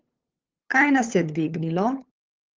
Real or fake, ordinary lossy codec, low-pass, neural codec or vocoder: fake; Opus, 16 kbps; 7.2 kHz; codec, 16 kHz, 8 kbps, FunCodec, trained on LibriTTS, 25 frames a second